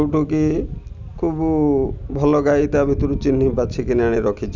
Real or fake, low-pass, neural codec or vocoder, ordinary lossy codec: real; 7.2 kHz; none; none